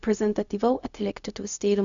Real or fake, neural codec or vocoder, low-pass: fake; codec, 16 kHz, 0.4 kbps, LongCat-Audio-Codec; 7.2 kHz